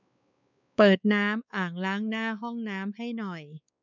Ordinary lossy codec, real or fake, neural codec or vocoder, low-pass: none; fake; codec, 16 kHz, 4 kbps, X-Codec, WavLM features, trained on Multilingual LibriSpeech; 7.2 kHz